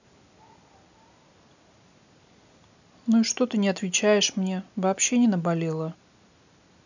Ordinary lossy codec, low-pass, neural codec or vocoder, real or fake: none; 7.2 kHz; none; real